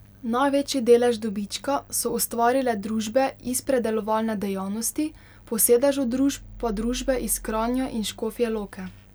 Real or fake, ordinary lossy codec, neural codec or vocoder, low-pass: real; none; none; none